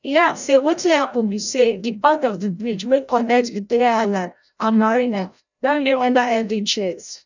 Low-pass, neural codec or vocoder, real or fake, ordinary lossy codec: 7.2 kHz; codec, 16 kHz, 0.5 kbps, FreqCodec, larger model; fake; none